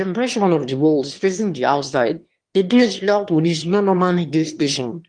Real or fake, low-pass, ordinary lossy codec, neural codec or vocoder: fake; 9.9 kHz; Opus, 24 kbps; autoencoder, 22.05 kHz, a latent of 192 numbers a frame, VITS, trained on one speaker